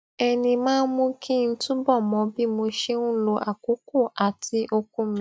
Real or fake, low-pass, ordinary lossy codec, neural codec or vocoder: real; none; none; none